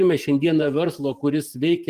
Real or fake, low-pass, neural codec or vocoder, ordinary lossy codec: fake; 14.4 kHz; vocoder, 44.1 kHz, 128 mel bands every 512 samples, BigVGAN v2; Opus, 24 kbps